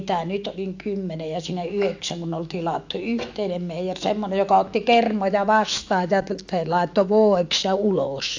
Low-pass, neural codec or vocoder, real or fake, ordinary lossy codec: 7.2 kHz; autoencoder, 48 kHz, 128 numbers a frame, DAC-VAE, trained on Japanese speech; fake; AAC, 48 kbps